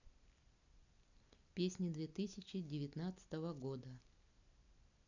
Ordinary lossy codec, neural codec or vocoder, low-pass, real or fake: none; none; 7.2 kHz; real